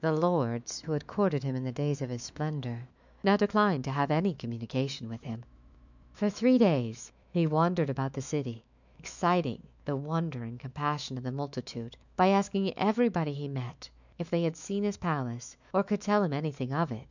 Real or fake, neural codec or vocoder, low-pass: fake; autoencoder, 48 kHz, 128 numbers a frame, DAC-VAE, trained on Japanese speech; 7.2 kHz